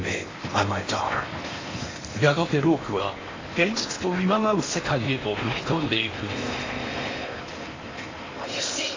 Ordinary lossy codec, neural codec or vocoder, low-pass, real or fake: AAC, 32 kbps; codec, 16 kHz in and 24 kHz out, 0.8 kbps, FocalCodec, streaming, 65536 codes; 7.2 kHz; fake